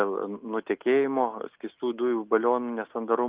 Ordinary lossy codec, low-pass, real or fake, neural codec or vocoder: Opus, 24 kbps; 3.6 kHz; real; none